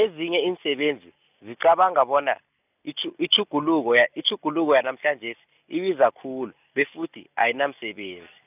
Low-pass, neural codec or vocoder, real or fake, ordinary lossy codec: 3.6 kHz; none; real; none